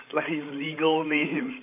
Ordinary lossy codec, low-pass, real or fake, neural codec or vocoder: MP3, 24 kbps; 3.6 kHz; fake; codec, 16 kHz, 16 kbps, FreqCodec, larger model